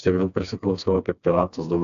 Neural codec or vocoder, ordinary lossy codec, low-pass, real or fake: codec, 16 kHz, 2 kbps, FreqCodec, smaller model; AAC, 96 kbps; 7.2 kHz; fake